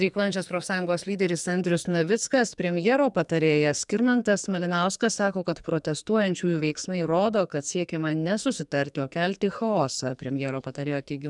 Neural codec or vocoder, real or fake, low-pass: codec, 44.1 kHz, 2.6 kbps, SNAC; fake; 10.8 kHz